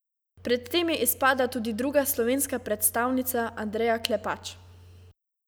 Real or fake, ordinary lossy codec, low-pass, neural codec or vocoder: real; none; none; none